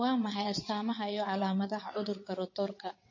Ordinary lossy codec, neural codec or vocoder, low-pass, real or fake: MP3, 32 kbps; vocoder, 22.05 kHz, 80 mel bands, WaveNeXt; 7.2 kHz; fake